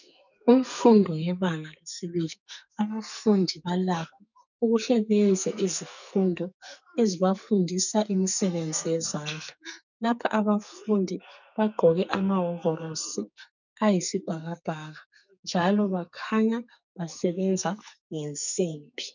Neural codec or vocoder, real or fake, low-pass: codec, 44.1 kHz, 2.6 kbps, SNAC; fake; 7.2 kHz